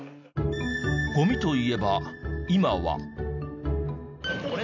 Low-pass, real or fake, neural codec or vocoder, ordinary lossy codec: 7.2 kHz; real; none; none